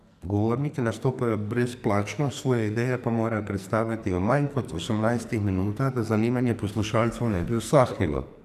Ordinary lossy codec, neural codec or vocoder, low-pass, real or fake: none; codec, 44.1 kHz, 2.6 kbps, SNAC; 14.4 kHz; fake